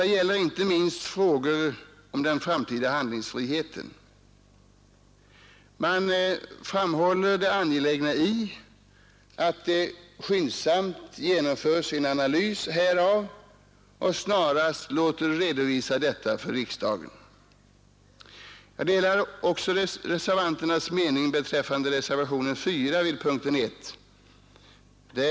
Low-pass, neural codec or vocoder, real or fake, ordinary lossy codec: none; none; real; none